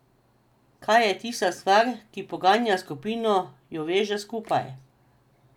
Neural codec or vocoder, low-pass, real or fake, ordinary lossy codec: none; 19.8 kHz; real; none